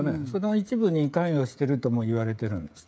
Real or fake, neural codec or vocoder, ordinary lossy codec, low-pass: fake; codec, 16 kHz, 16 kbps, FreqCodec, smaller model; none; none